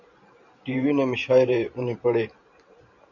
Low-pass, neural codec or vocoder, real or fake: 7.2 kHz; vocoder, 44.1 kHz, 128 mel bands every 512 samples, BigVGAN v2; fake